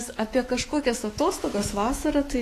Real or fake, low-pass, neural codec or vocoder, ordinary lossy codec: fake; 14.4 kHz; autoencoder, 48 kHz, 128 numbers a frame, DAC-VAE, trained on Japanese speech; AAC, 48 kbps